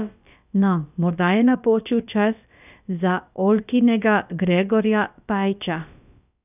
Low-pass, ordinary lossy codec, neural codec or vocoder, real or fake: 3.6 kHz; none; codec, 16 kHz, about 1 kbps, DyCAST, with the encoder's durations; fake